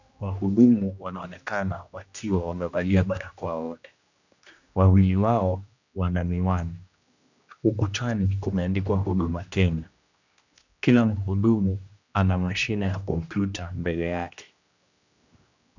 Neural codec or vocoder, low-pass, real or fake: codec, 16 kHz, 1 kbps, X-Codec, HuBERT features, trained on general audio; 7.2 kHz; fake